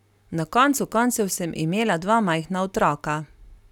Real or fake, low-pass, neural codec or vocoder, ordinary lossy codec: real; 19.8 kHz; none; none